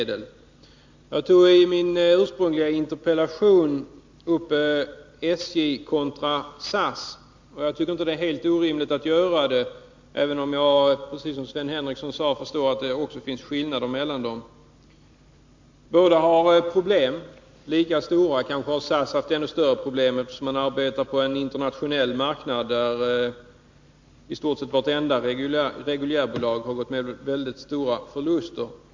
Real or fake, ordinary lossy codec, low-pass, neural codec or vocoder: real; MP3, 48 kbps; 7.2 kHz; none